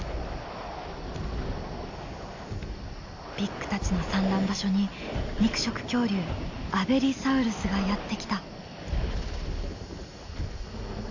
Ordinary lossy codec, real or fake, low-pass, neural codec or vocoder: AAC, 48 kbps; real; 7.2 kHz; none